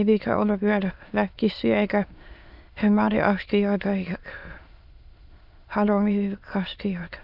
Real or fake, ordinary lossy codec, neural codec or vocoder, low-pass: fake; Opus, 64 kbps; autoencoder, 22.05 kHz, a latent of 192 numbers a frame, VITS, trained on many speakers; 5.4 kHz